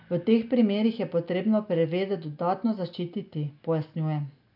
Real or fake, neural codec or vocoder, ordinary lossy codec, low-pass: real; none; none; 5.4 kHz